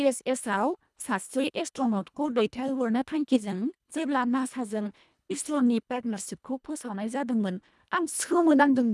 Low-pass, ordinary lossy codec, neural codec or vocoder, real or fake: none; none; codec, 24 kHz, 1.5 kbps, HILCodec; fake